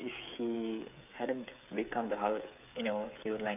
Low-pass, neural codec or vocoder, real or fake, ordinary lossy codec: 3.6 kHz; codec, 16 kHz, 8 kbps, FreqCodec, smaller model; fake; none